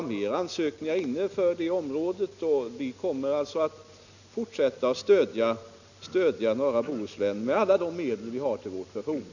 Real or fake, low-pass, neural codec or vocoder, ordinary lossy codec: real; 7.2 kHz; none; none